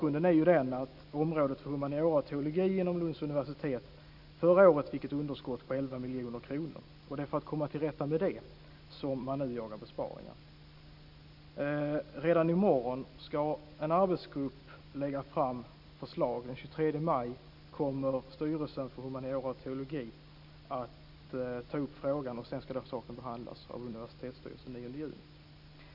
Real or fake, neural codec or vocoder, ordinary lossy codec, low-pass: real; none; none; 5.4 kHz